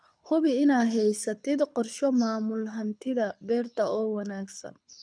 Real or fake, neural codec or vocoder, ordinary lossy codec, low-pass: fake; codec, 24 kHz, 6 kbps, HILCodec; none; 9.9 kHz